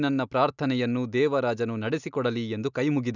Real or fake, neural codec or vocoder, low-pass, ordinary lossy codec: real; none; 7.2 kHz; none